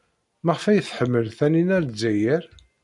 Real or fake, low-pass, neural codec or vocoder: real; 10.8 kHz; none